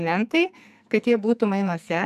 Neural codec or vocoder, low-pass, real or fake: codec, 44.1 kHz, 2.6 kbps, SNAC; 14.4 kHz; fake